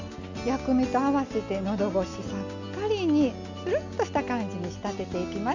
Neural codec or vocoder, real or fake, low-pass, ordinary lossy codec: none; real; 7.2 kHz; none